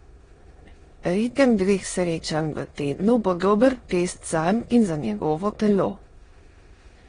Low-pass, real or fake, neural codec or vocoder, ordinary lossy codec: 9.9 kHz; fake; autoencoder, 22.05 kHz, a latent of 192 numbers a frame, VITS, trained on many speakers; AAC, 32 kbps